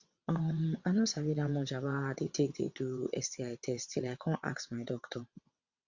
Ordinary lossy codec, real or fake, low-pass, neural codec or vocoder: Opus, 64 kbps; fake; 7.2 kHz; vocoder, 22.05 kHz, 80 mel bands, WaveNeXt